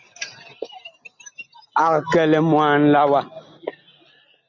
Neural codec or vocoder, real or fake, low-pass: none; real; 7.2 kHz